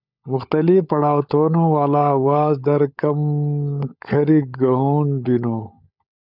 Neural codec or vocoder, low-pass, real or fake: codec, 16 kHz, 16 kbps, FunCodec, trained on LibriTTS, 50 frames a second; 5.4 kHz; fake